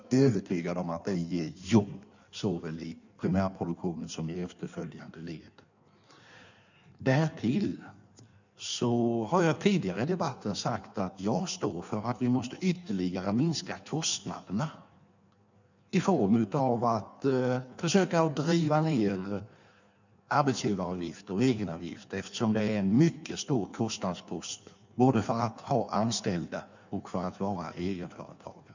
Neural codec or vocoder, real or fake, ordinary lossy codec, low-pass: codec, 16 kHz in and 24 kHz out, 1.1 kbps, FireRedTTS-2 codec; fake; none; 7.2 kHz